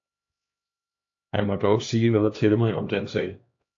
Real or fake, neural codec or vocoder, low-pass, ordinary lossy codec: fake; codec, 16 kHz, 2 kbps, X-Codec, HuBERT features, trained on LibriSpeech; 7.2 kHz; AAC, 48 kbps